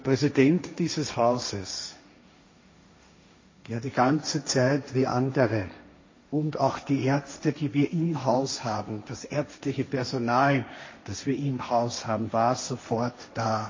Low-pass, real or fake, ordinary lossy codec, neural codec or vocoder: 7.2 kHz; fake; MP3, 32 kbps; codec, 16 kHz, 1.1 kbps, Voila-Tokenizer